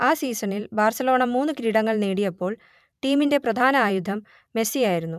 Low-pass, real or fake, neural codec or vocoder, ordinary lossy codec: 14.4 kHz; fake; vocoder, 44.1 kHz, 128 mel bands every 512 samples, BigVGAN v2; none